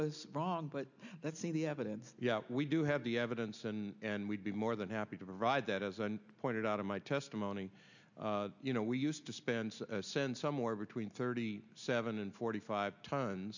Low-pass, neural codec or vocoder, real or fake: 7.2 kHz; none; real